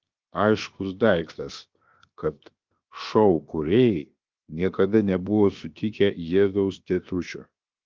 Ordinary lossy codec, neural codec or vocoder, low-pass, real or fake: Opus, 32 kbps; codec, 16 kHz, 0.7 kbps, FocalCodec; 7.2 kHz; fake